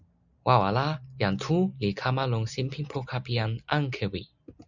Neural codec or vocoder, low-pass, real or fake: none; 7.2 kHz; real